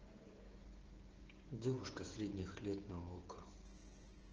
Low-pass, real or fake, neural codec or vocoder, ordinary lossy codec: 7.2 kHz; fake; codec, 16 kHz, 16 kbps, FreqCodec, smaller model; Opus, 16 kbps